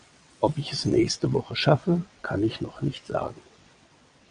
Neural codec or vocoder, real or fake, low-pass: vocoder, 22.05 kHz, 80 mel bands, WaveNeXt; fake; 9.9 kHz